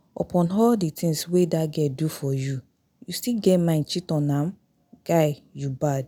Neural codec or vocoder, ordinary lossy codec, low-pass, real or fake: none; none; none; real